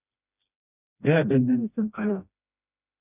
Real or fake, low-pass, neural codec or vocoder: fake; 3.6 kHz; codec, 16 kHz, 1 kbps, FreqCodec, smaller model